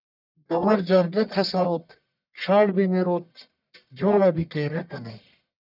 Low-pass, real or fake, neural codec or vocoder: 5.4 kHz; fake; codec, 44.1 kHz, 1.7 kbps, Pupu-Codec